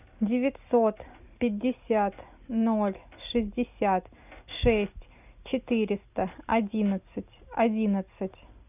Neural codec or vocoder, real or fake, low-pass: none; real; 3.6 kHz